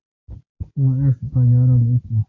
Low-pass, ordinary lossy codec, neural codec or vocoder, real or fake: 7.2 kHz; AAC, 32 kbps; none; real